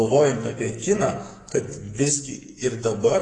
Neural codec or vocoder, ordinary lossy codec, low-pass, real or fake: codec, 44.1 kHz, 2.6 kbps, SNAC; AAC, 32 kbps; 10.8 kHz; fake